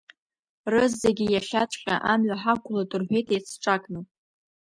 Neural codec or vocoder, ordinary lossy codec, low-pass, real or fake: none; MP3, 96 kbps; 9.9 kHz; real